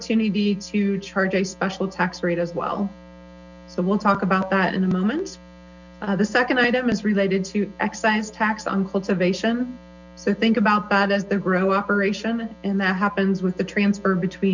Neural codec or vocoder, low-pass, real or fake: none; 7.2 kHz; real